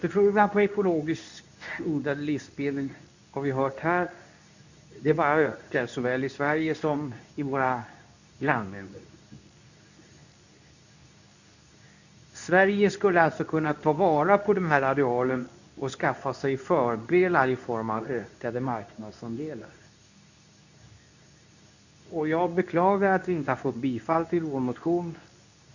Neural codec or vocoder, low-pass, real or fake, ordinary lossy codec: codec, 24 kHz, 0.9 kbps, WavTokenizer, medium speech release version 2; 7.2 kHz; fake; none